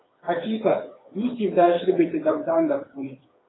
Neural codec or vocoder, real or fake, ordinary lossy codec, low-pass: codec, 16 kHz, 4 kbps, FreqCodec, smaller model; fake; AAC, 16 kbps; 7.2 kHz